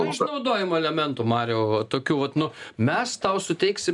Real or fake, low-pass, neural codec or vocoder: real; 10.8 kHz; none